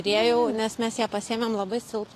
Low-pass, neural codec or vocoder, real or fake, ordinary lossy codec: 14.4 kHz; none; real; AAC, 48 kbps